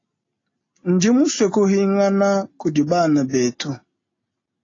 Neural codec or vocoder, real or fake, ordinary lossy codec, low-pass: none; real; AAC, 32 kbps; 7.2 kHz